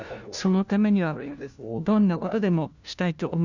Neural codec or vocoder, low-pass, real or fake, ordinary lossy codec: codec, 16 kHz, 1 kbps, FunCodec, trained on LibriTTS, 50 frames a second; 7.2 kHz; fake; none